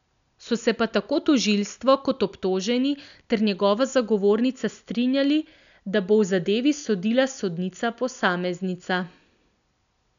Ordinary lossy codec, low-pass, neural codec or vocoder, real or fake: none; 7.2 kHz; none; real